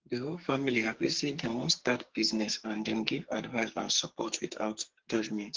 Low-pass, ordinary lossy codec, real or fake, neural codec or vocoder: 7.2 kHz; Opus, 16 kbps; fake; codec, 44.1 kHz, 2.6 kbps, SNAC